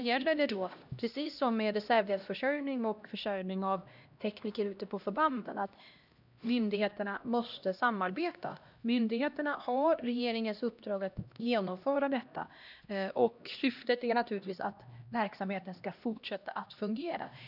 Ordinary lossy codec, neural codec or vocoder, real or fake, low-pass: none; codec, 16 kHz, 1 kbps, X-Codec, HuBERT features, trained on LibriSpeech; fake; 5.4 kHz